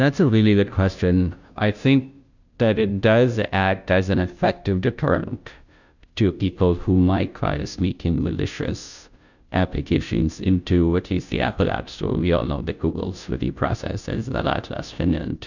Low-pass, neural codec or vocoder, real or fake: 7.2 kHz; codec, 16 kHz, 0.5 kbps, FunCodec, trained on Chinese and English, 25 frames a second; fake